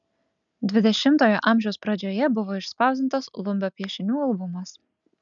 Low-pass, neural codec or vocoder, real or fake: 7.2 kHz; none; real